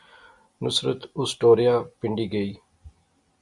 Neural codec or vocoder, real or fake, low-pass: none; real; 10.8 kHz